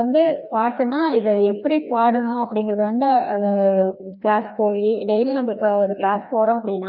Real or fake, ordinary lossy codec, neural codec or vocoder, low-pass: fake; none; codec, 16 kHz, 1 kbps, FreqCodec, larger model; 5.4 kHz